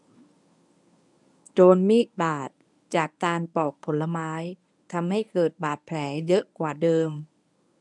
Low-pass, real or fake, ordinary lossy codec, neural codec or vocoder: 10.8 kHz; fake; none; codec, 24 kHz, 0.9 kbps, WavTokenizer, medium speech release version 1